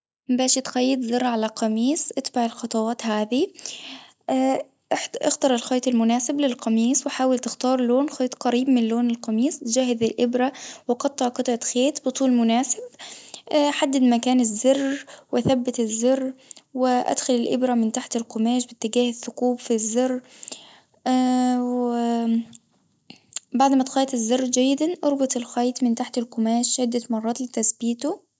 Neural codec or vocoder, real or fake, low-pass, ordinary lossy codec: none; real; none; none